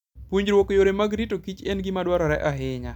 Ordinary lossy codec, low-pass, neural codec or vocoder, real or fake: none; 19.8 kHz; none; real